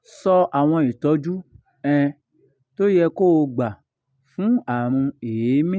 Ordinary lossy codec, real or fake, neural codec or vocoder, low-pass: none; real; none; none